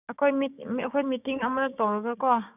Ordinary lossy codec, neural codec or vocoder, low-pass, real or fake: none; codec, 16 kHz, 6 kbps, DAC; 3.6 kHz; fake